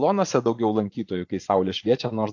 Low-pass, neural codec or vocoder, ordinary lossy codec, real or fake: 7.2 kHz; vocoder, 24 kHz, 100 mel bands, Vocos; AAC, 48 kbps; fake